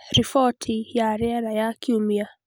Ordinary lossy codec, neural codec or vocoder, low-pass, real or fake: none; none; none; real